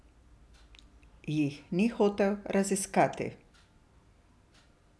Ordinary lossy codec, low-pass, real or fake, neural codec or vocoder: none; none; real; none